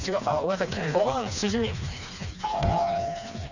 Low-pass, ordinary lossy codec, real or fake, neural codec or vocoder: 7.2 kHz; none; fake; codec, 16 kHz, 2 kbps, FreqCodec, smaller model